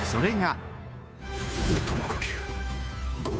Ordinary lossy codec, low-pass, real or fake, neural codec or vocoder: none; none; fake; codec, 16 kHz, 2 kbps, FunCodec, trained on Chinese and English, 25 frames a second